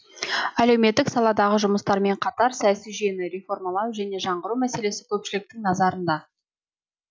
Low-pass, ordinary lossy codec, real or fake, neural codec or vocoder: none; none; real; none